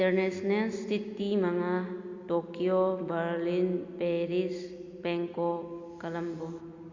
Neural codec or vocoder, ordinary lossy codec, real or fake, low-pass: none; Opus, 64 kbps; real; 7.2 kHz